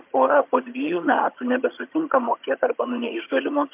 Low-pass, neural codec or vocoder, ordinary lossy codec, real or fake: 3.6 kHz; vocoder, 22.05 kHz, 80 mel bands, HiFi-GAN; MP3, 32 kbps; fake